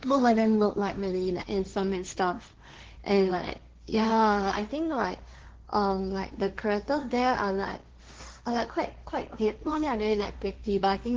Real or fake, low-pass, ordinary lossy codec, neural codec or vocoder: fake; 7.2 kHz; Opus, 16 kbps; codec, 16 kHz, 1.1 kbps, Voila-Tokenizer